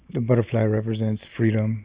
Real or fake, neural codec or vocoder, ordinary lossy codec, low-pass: real; none; Opus, 64 kbps; 3.6 kHz